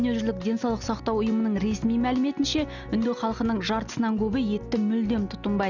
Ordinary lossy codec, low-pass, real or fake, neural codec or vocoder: none; 7.2 kHz; real; none